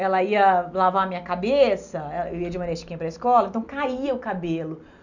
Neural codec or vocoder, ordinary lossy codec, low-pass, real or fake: none; none; 7.2 kHz; real